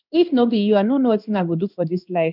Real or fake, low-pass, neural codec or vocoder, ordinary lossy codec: fake; 5.4 kHz; codec, 16 kHz in and 24 kHz out, 1 kbps, XY-Tokenizer; none